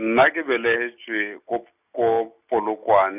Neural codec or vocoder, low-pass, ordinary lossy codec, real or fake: none; 3.6 kHz; none; real